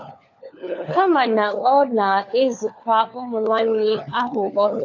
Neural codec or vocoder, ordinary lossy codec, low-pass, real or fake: codec, 16 kHz, 4 kbps, FunCodec, trained on LibriTTS, 50 frames a second; AAC, 48 kbps; 7.2 kHz; fake